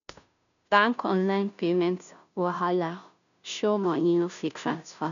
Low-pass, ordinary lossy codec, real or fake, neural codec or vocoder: 7.2 kHz; none; fake; codec, 16 kHz, 0.5 kbps, FunCodec, trained on Chinese and English, 25 frames a second